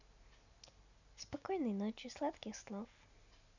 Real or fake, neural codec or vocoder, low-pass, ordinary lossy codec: real; none; 7.2 kHz; none